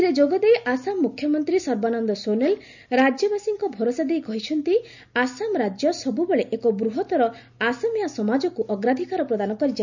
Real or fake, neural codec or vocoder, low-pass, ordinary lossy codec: real; none; 7.2 kHz; none